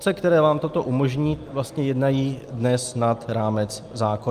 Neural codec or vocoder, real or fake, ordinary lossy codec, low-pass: none; real; Opus, 32 kbps; 14.4 kHz